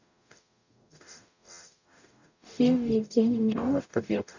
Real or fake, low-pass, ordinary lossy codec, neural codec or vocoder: fake; 7.2 kHz; none; codec, 44.1 kHz, 0.9 kbps, DAC